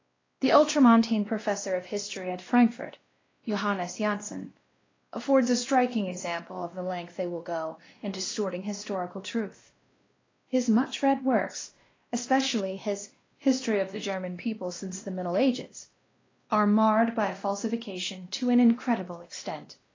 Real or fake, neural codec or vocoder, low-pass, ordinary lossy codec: fake; codec, 16 kHz, 1 kbps, X-Codec, WavLM features, trained on Multilingual LibriSpeech; 7.2 kHz; AAC, 32 kbps